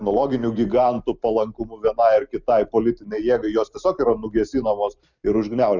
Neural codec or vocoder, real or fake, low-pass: none; real; 7.2 kHz